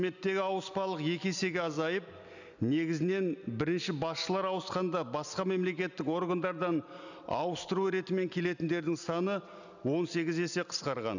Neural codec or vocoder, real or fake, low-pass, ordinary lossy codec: none; real; 7.2 kHz; none